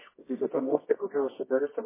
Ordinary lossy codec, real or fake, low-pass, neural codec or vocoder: MP3, 16 kbps; fake; 3.6 kHz; codec, 24 kHz, 0.9 kbps, WavTokenizer, medium music audio release